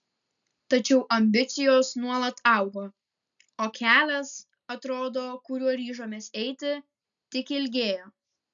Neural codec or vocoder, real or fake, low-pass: none; real; 7.2 kHz